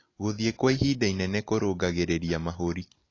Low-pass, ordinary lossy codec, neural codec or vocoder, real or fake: 7.2 kHz; AAC, 32 kbps; none; real